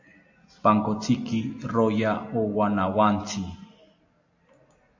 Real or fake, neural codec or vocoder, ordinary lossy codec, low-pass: real; none; AAC, 48 kbps; 7.2 kHz